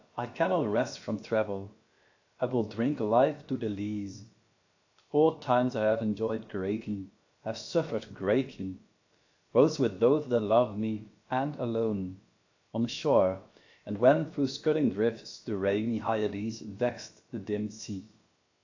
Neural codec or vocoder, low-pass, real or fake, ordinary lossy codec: codec, 16 kHz, about 1 kbps, DyCAST, with the encoder's durations; 7.2 kHz; fake; AAC, 48 kbps